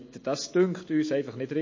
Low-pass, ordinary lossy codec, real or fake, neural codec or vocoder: 7.2 kHz; MP3, 32 kbps; real; none